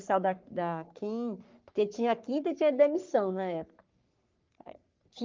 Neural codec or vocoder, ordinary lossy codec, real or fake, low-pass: codec, 44.1 kHz, 3.4 kbps, Pupu-Codec; Opus, 24 kbps; fake; 7.2 kHz